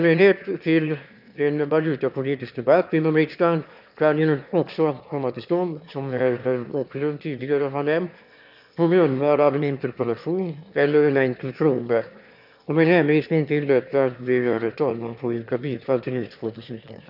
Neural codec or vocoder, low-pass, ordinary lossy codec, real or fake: autoencoder, 22.05 kHz, a latent of 192 numbers a frame, VITS, trained on one speaker; 5.4 kHz; none; fake